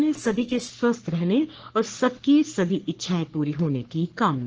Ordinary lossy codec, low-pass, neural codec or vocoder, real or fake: Opus, 16 kbps; 7.2 kHz; codec, 44.1 kHz, 3.4 kbps, Pupu-Codec; fake